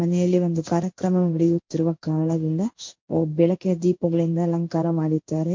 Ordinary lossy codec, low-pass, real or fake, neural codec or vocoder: MP3, 48 kbps; 7.2 kHz; fake; codec, 16 kHz in and 24 kHz out, 1 kbps, XY-Tokenizer